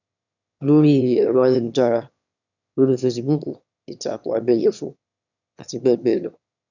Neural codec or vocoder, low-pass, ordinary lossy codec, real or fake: autoencoder, 22.05 kHz, a latent of 192 numbers a frame, VITS, trained on one speaker; 7.2 kHz; none; fake